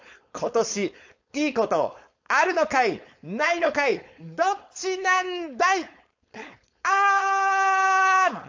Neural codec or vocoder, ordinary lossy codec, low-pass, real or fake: codec, 16 kHz, 4.8 kbps, FACodec; AAC, 48 kbps; 7.2 kHz; fake